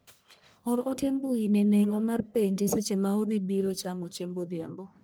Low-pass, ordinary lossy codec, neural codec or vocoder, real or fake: none; none; codec, 44.1 kHz, 1.7 kbps, Pupu-Codec; fake